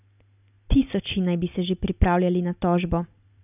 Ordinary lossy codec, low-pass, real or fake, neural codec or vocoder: none; 3.6 kHz; real; none